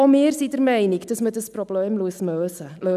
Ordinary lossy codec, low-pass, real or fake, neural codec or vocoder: none; 14.4 kHz; real; none